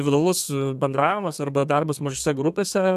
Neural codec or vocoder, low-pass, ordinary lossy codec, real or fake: codec, 32 kHz, 1.9 kbps, SNAC; 14.4 kHz; AAC, 96 kbps; fake